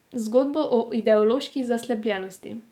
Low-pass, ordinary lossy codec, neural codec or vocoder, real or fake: 19.8 kHz; none; codec, 44.1 kHz, 7.8 kbps, DAC; fake